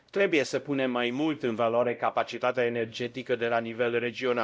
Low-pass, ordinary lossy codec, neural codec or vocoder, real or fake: none; none; codec, 16 kHz, 0.5 kbps, X-Codec, WavLM features, trained on Multilingual LibriSpeech; fake